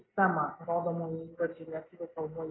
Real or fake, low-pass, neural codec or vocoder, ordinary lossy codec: real; 7.2 kHz; none; AAC, 16 kbps